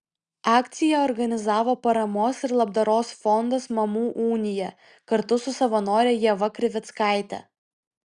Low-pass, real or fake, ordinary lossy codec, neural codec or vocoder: 9.9 kHz; real; Opus, 64 kbps; none